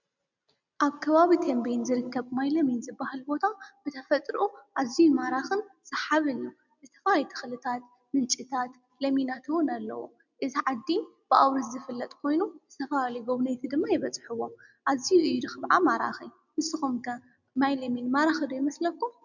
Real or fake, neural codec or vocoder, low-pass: real; none; 7.2 kHz